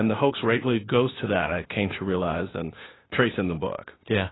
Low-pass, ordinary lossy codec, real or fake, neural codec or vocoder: 7.2 kHz; AAC, 16 kbps; fake; codec, 16 kHz, 0.8 kbps, ZipCodec